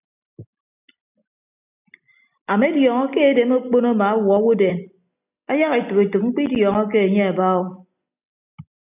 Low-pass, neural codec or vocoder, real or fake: 3.6 kHz; none; real